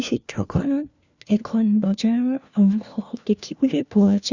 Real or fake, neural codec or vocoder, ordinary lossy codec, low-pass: fake; codec, 16 kHz, 1 kbps, FunCodec, trained on LibriTTS, 50 frames a second; Opus, 64 kbps; 7.2 kHz